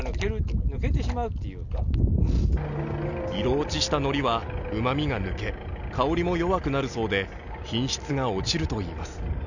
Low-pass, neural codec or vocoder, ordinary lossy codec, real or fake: 7.2 kHz; none; none; real